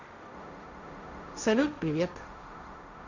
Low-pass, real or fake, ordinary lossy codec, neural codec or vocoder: 7.2 kHz; fake; none; codec, 16 kHz, 1.1 kbps, Voila-Tokenizer